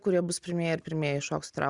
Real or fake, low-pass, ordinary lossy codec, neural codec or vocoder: real; 10.8 kHz; Opus, 64 kbps; none